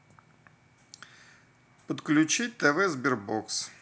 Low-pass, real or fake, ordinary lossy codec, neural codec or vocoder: none; real; none; none